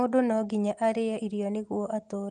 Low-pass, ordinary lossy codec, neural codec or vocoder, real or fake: 10.8 kHz; Opus, 24 kbps; none; real